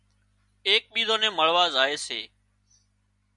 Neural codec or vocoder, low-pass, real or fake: none; 10.8 kHz; real